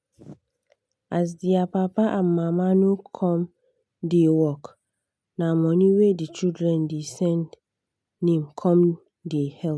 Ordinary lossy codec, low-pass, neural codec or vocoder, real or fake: none; none; none; real